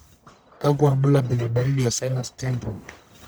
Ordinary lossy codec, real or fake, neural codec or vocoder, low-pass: none; fake; codec, 44.1 kHz, 1.7 kbps, Pupu-Codec; none